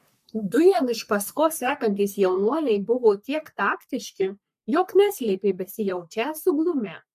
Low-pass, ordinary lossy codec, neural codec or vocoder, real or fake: 14.4 kHz; MP3, 64 kbps; codec, 44.1 kHz, 3.4 kbps, Pupu-Codec; fake